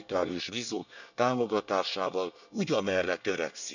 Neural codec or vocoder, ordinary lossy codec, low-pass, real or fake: codec, 24 kHz, 1 kbps, SNAC; none; 7.2 kHz; fake